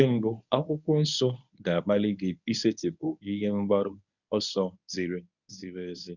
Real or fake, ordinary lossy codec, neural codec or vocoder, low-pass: fake; none; codec, 24 kHz, 0.9 kbps, WavTokenizer, medium speech release version 1; 7.2 kHz